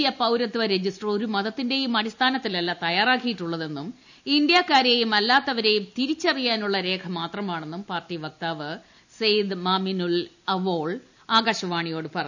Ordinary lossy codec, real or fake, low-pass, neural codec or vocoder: none; real; 7.2 kHz; none